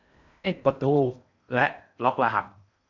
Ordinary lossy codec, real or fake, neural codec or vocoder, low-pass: none; fake; codec, 16 kHz in and 24 kHz out, 0.6 kbps, FocalCodec, streaming, 4096 codes; 7.2 kHz